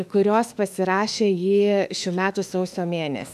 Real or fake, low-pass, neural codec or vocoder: fake; 14.4 kHz; autoencoder, 48 kHz, 32 numbers a frame, DAC-VAE, trained on Japanese speech